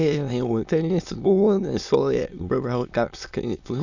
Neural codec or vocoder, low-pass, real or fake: autoencoder, 22.05 kHz, a latent of 192 numbers a frame, VITS, trained on many speakers; 7.2 kHz; fake